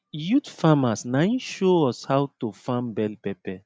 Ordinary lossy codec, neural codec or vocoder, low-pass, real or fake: none; none; none; real